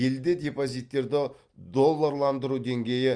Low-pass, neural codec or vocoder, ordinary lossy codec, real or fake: 9.9 kHz; none; Opus, 32 kbps; real